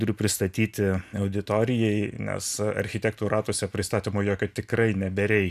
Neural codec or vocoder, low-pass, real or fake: none; 14.4 kHz; real